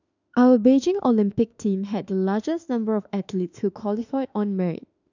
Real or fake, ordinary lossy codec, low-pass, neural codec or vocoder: fake; none; 7.2 kHz; autoencoder, 48 kHz, 32 numbers a frame, DAC-VAE, trained on Japanese speech